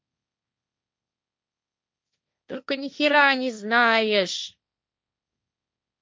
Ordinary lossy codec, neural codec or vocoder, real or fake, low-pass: none; codec, 16 kHz, 1.1 kbps, Voila-Tokenizer; fake; none